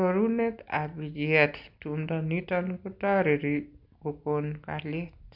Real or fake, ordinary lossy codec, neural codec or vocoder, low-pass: real; MP3, 48 kbps; none; 5.4 kHz